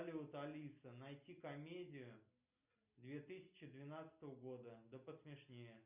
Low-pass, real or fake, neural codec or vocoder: 3.6 kHz; real; none